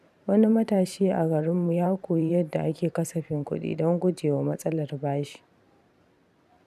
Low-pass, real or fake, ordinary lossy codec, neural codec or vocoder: 14.4 kHz; fake; none; vocoder, 44.1 kHz, 128 mel bands every 512 samples, BigVGAN v2